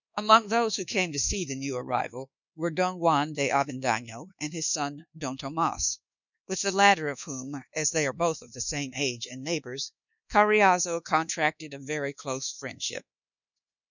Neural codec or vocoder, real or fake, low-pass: codec, 24 kHz, 1.2 kbps, DualCodec; fake; 7.2 kHz